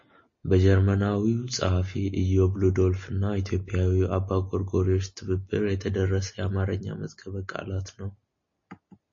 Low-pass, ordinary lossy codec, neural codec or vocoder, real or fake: 7.2 kHz; MP3, 32 kbps; none; real